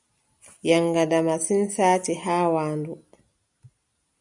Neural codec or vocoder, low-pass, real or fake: none; 10.8 kHz; real